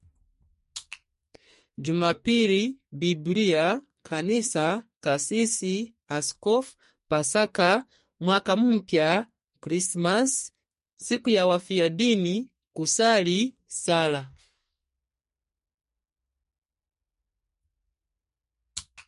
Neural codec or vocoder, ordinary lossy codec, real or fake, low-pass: codec, 44.1 kHz, 2.6 kbps, SNAC; MP3, 48 kbps; fake; 14.4 kHz